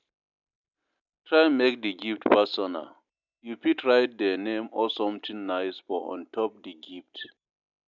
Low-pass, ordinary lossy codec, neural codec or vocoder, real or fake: 7.2 kHz; none; none; real